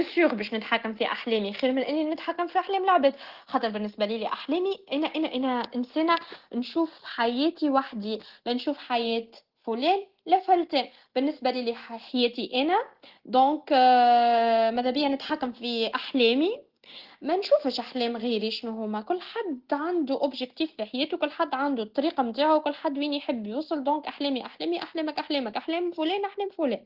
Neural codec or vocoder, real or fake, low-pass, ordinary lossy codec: none; real; 5.4 kHz; Opus, 16 kbps